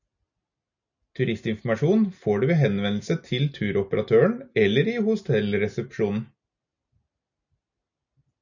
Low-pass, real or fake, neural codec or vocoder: 7.2 kHz; real; none